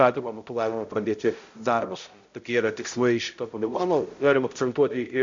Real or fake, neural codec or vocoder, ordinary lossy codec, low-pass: fake; codec, 16 kHz, 0.5 kbps, X-Codec, HuBERT features, trained on balanced general audio; MP3, 48 kbps; 7.2 kHz